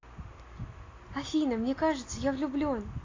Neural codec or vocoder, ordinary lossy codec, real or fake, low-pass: none; AAC, 32 kbps; real; 7.2 kHz